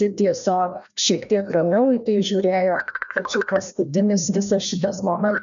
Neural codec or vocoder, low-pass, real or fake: codec, 16 kHz, 1 kbps, FreqCodec, larger model; 7.2 kHz; fake